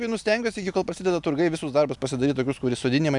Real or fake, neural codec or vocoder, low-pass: real; none; 10.8 kHz